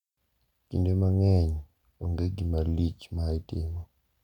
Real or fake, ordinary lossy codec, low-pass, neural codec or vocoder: real; none; 19.8 kHz; none